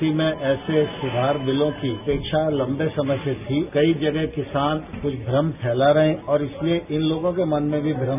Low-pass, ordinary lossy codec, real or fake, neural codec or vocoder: 3.6 kHz; none; real; none